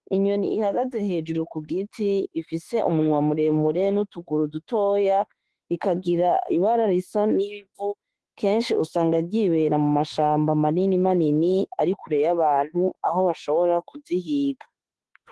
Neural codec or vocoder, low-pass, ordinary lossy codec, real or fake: autoencoder, 48 kHz, 32 numbers a frame, DAC-VAE, trained on Japanese speech; 10.8 kHz; Opus, 16 kbps; fake